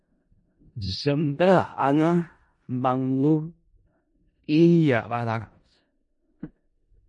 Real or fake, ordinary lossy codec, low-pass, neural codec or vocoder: fake; MP3, 48 kbps; 10.8 kHz; codec, 16 kHz in and 24 kHz out, 0.4 kbps, LongCat-Audio-Codec, four codebook decoder